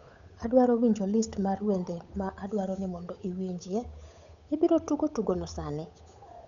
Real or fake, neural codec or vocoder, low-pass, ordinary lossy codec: fake; codec, 16 kHz, 8 kbps, FunCodec, trained on Chinese and English, 25 frames a second; 7.2 kHz; none